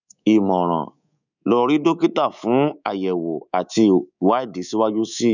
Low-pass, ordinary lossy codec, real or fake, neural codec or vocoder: 7.2 kHz; none; fake; codec, 24 kHz, 3.1 kbps, DualCodec